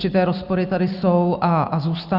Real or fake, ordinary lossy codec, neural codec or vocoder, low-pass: real; Opus, 64 kbps; none; 5.4 kHz